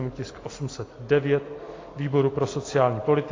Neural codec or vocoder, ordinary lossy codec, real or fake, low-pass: none; AAC, 32 kbps; real; 7.2 kHz